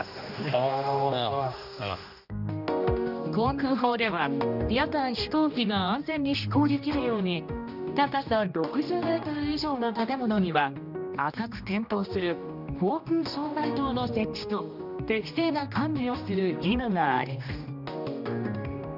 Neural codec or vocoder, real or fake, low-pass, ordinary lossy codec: codec, 16 kHz, 1 kbps, X-Codec, HuBERT features, trained on general audio; fake; 5.4 kHz; none